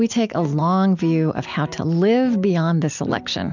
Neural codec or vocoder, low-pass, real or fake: none; 7.2 kHz; real